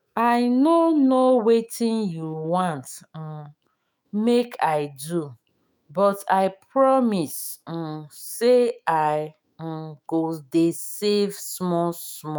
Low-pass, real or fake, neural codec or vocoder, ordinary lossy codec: none; fake; autoencoder, 48 kHz, 128 numbers a frame, DAC-VAE, trained on Japanese speech; none